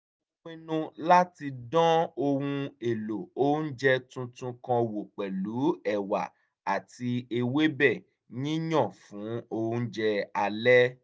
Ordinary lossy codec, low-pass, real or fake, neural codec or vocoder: none; none; real; none